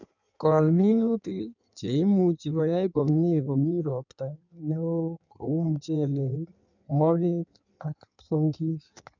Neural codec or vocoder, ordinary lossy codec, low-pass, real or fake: codec, 16 kHz in and 24 kHz out, 1.1 kbps, FireRedTTS-2 codec; none; 7.2 kHz; fake